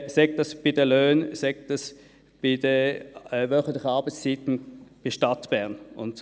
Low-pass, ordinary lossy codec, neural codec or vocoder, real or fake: none; none; none; real